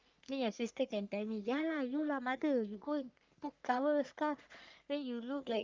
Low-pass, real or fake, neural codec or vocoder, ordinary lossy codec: 7.2 kHz; fake; codec, 44.1 kHz, 3.4 kbps, Pupu-Codec; Opus, 24 kbps